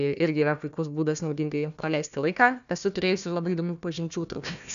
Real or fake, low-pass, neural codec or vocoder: fake; 7.2 kHz; codec, 16 kHz, 1 kbps, FunCodec, trained on Chinese and English, 50 frames a second